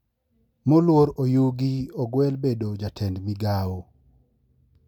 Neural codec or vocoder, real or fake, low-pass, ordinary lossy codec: none; real; 19.8 kHz; MP3, 96 kbps